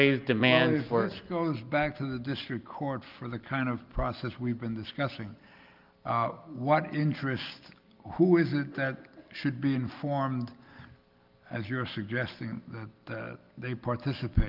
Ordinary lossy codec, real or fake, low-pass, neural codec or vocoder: Opus, 32 kbps; real; 5.4 kHz; none